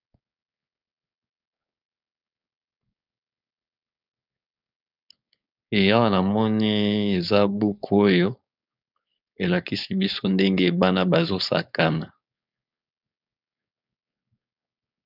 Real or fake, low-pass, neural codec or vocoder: fake; 5.4 kHz; codec, 16 kHz, 4.8 kbps, FACodec